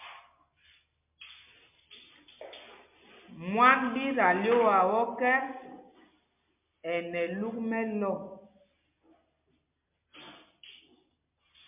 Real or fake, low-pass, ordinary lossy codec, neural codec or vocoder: real; 3.6 kHz; MP3, 32 kbps; none